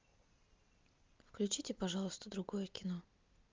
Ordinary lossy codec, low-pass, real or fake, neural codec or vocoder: Opus, 32 kbps; 7.2 kHz; real; none